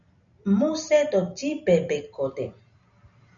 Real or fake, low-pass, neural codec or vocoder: real; 7.2 kHz; none